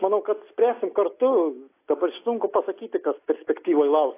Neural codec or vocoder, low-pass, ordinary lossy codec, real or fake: none; 3.6 kHz; AAC, 24 kbps; real